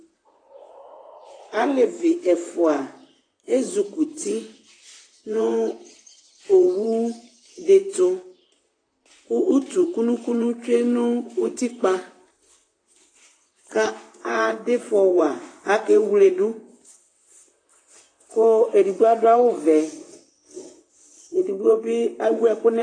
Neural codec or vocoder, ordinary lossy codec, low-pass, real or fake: vocoder, 44.1 kHz, 128 mel bands, Pupu-Vocoder; AAC, 32 kbps; 9.9 kHz; fake